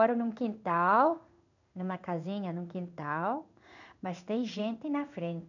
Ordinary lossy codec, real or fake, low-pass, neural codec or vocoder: none; fake; 7.2 kHz; codec, 16 kHz in and 24 kHz out, 1 kbps, XY-Tokenizer